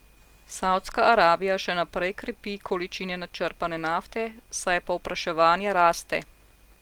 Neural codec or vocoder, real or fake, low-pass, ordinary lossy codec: none; real; 19.8 kHz; Opus, 24 kbps